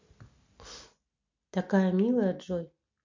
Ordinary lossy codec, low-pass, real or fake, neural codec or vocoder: MP3, 48 kbps; 7.2 kHz; real; none